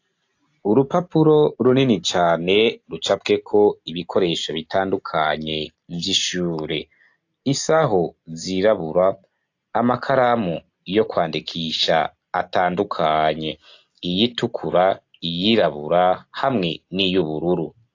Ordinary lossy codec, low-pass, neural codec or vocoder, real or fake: AAC, 48 kbps; 7.2 kHz; none; real